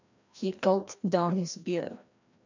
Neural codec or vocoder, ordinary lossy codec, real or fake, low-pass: codec, 16 kHz, 1 kbps, FreqCodec, larger model; none; fake; 7.2 kHz